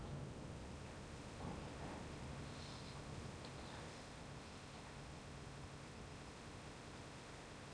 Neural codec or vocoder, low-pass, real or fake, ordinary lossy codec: codec, 16 kHz in and 24 kHz out, 0.8 kbps, FocalCodec, streaming, 65536 codes; 9.9 kHz; fake; none